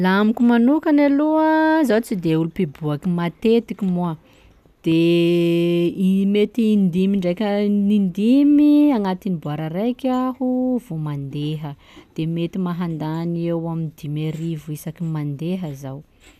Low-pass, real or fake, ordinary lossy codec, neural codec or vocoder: 14.4 kHz; real; none; none